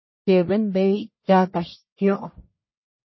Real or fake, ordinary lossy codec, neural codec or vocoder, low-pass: fake; MP3, 24 kbps; codec, 44.1 kHz, 1.7 kbps, Pupu-Codec; 7.2 kHz